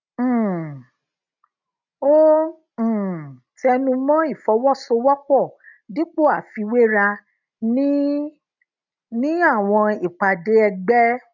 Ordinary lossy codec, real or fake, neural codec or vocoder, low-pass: none; real; none; 7.2 kHz